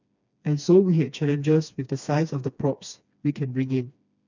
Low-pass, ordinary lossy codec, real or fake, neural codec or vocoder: 7.2 kHz; none; fake; codec, 16 kHz, 2 kbps, FreqCodec, smaller model